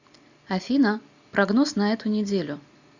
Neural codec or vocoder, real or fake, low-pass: none; real; 7.2 kHz